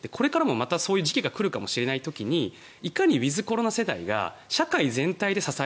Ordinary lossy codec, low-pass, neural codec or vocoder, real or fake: none; none; none; real